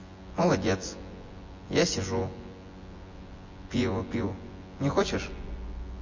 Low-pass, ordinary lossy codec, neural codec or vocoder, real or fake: 7.2 kHz; MP3, 32 kbps; vocoder, 24 kHz, 100 mel bands, Vocos; fake